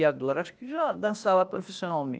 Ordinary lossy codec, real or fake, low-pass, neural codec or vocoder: none; fake; none; codec, 16 kHz, 0.8 kbps, ZipCodec